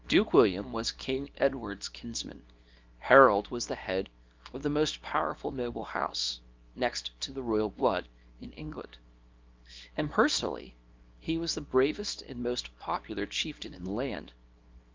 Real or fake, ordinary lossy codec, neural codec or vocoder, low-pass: fake; Opus, 32 kbps; codec, 24 kHz, 0.9 kbps, WavTokenizer, small release; 7.2 kHz